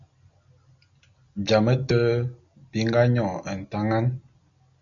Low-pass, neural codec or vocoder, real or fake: 7.2 kHz; none; real